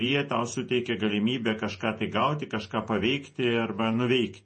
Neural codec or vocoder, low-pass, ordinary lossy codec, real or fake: vocoder, 48 kHz, 128 mel bands, Vocos; 10.8 kHz; MP3, 32 kbps; fake